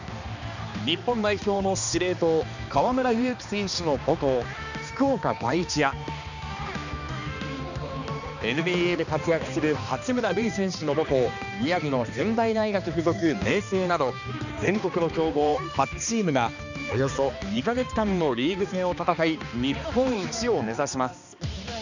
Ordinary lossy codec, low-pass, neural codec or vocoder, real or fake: none; 7.2 kHz; codec, 16 kHz, 2 kbps, X-Codec, HuBERT features, trained on balanced general audio; fake